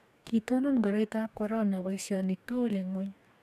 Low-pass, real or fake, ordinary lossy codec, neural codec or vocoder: 14.4 kHz; fake; none; codec, 44.1 kHz, 2.6 kbps, DAC